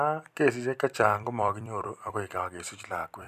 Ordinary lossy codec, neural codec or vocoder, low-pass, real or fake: none; vocoder, 44.1 kHz, 128 mel bands every 256 samples, BigVGAN v2; 14.4 kHz; fake